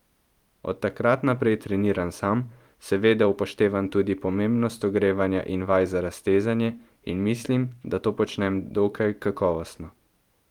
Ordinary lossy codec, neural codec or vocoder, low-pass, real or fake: Opus, 32 kbps; vocoder, 44.1 kHz, 128 mel bands every 512 samples, BigVGAN v2; 19.8 kHz; fake